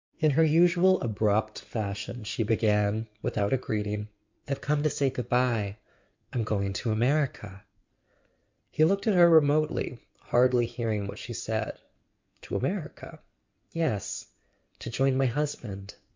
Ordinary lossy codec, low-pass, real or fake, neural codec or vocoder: MP3, 64 kbps; 7.2 kHz; fake; codec, 16 kHz in and 24 kHz out, 2.2 kbps, FireRedTTS-2 codec